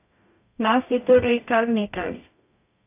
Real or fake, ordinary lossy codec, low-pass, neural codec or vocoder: fake; AAC, 32 kbps; 3.6 kHz; codec, 44.1 kHz, 0.9 kbps, DAC